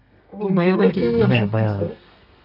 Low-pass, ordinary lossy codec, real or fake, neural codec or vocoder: 5.4 kHz; none; fake; codec, 32 kHz, 1.9 kbps, SNAC